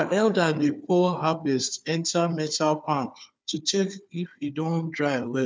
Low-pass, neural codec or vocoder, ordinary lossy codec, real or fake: none; codec, 16 kHz, 4 kbps, FunCodec, trained on Chinese and English, 50 frames a second; none; fake